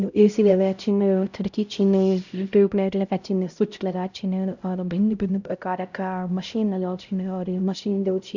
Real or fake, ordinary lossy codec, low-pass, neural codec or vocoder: fake; none; 7.2 kHz; codec, 16 kHz, 0.5 kbps, X-Codec, HuBERT features, trained on LibriSpeech